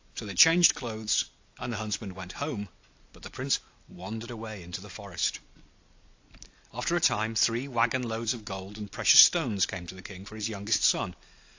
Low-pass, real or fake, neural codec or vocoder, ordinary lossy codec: 7.2 kHz; real; none; AAC, 48 kbps